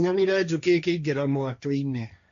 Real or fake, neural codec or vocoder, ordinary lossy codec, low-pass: fake; codec, 16 kHz, 1.1 kbps, Voila-Tokenizer; AAC, 64 kbps; 7.2 kHz